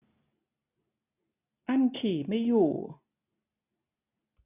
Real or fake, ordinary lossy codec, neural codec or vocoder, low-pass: real; none; none; 3.6 kHz